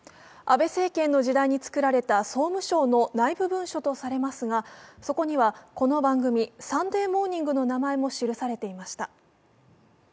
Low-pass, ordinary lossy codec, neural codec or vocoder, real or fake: none; none; none; real